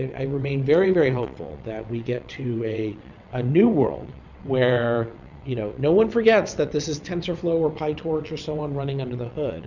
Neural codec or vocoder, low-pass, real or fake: vocoder, 22.05 kHz, 80 mel bands, WaveNeXt; 7.2 kHz; fake